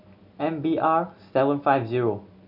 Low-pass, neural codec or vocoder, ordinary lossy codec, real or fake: 5.4 kHz; none; none; real